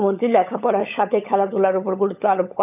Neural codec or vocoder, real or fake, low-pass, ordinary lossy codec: codec, 16 kHz, 16 kbps, FunCodec, trained on LibriTTS, 50 frames a second; fake; 3.6 kHz; none